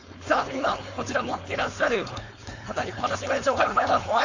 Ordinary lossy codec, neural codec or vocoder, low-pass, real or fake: AAC, 48 kbps; codec, 16 kHz, 4.8 kbps, FACodec; 7.2 kHz; fake